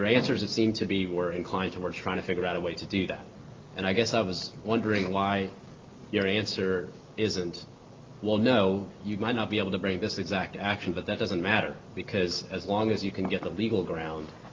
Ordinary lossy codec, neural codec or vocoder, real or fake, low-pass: Opus, 24 kbps; none; real; 7.2 kHz